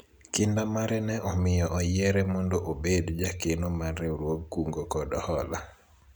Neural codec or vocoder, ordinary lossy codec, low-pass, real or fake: none; none; none; real